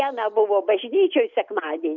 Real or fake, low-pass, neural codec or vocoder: real; 7.2 kHz; none